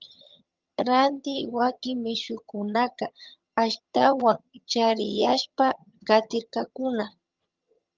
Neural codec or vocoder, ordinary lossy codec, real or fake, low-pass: vocoder, 22.05 kHz, 80 mel bands, HiFi-GAN; Opus, 24 kbps; fake; 7.2 kHz